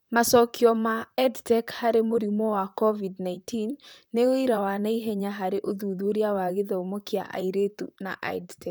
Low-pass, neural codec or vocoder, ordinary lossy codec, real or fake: none; vocoder, 44.1 kHz, 128 mel bands, Pupu-Vocoder; none; fake